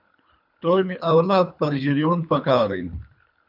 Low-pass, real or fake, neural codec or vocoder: 5.4 kHz; fake; codec, 24 kHz, 3 kbps, HILCodec